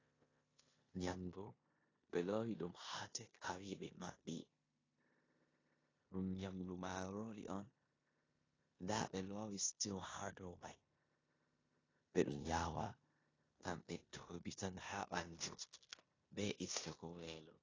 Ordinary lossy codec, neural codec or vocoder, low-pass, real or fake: AAC, 32 kbps; codec, 16 kHz in and 24 kHz out, 0.9 kbps, LongCat-Audio-Codec, four codebook decoder; 7.2 kHz; fake